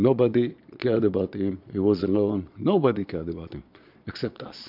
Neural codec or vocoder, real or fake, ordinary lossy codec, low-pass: vocoder, 22.05 kHz, 80 mel bands, Vocos; fake; MP3, 48 kbps; 5.4 kHz